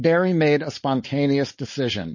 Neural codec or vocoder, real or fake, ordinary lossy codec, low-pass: codec, 16 kHz, 4.8 kbps, FACodec; fake; MP3, 32 kbps; 7.2 kHz